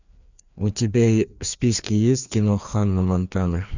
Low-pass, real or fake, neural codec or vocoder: 7.2 kHz; fake; codec, 16 kHz, 2 kbps, FreqCodec, larger model